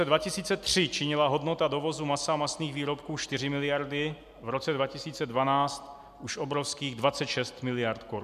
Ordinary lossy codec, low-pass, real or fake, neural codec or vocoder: MP3, 96 kbps; 14.4 kHz; real; none